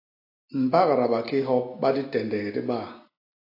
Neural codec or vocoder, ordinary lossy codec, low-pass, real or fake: none; AAC, 32 kbps; 5.4 kHz; real